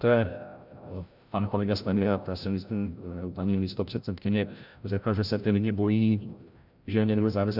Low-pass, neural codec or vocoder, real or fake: 5.4 kHz; codec, 16 kHz, 0.5 kbps, FreqCodec, larger model; fake